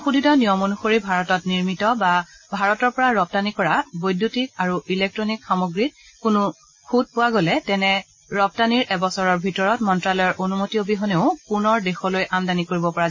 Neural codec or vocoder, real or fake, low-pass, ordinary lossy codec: none; real; 7.2 kHz; MP3, 32 kbps